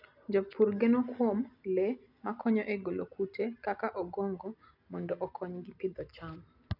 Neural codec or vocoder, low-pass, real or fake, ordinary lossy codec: none; 5.4 kHz; real; none